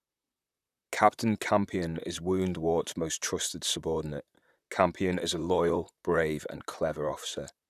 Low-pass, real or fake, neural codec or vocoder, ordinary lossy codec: 14.4 kHz; fake; vocoder, 44.1 kHz, 128 mel bands, Pupu-Vocoder; none